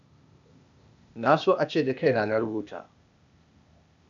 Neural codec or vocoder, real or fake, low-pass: codec, 16 kHz, 0.8 kbps, ZipCodec; fake; 7.2 kHz